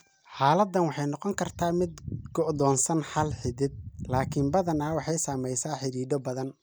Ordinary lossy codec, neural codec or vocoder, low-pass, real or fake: none; none; none; real